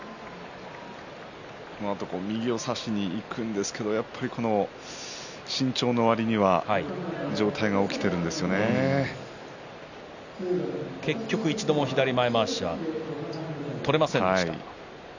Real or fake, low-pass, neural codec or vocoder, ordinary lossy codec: real; 7.2 kHz; none; none